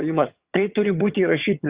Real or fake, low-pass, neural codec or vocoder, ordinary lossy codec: fake; 3.6 kHz; vocoder, 22.05 kHz, 80 mel bands, HiFi-GAN; Opus, 64 kbps